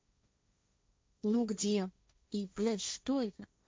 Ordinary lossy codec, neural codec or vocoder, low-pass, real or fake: none; codec, 16 kHz, 1.1 kbps, Voila-Tokenizer; none; fake